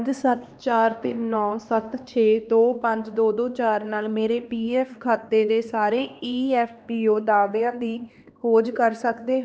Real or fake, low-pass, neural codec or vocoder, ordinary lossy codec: fake; none; codec, 16 kHz, 2 kbps, X-Codec, HuBERT features, trained on LibriSpeech; none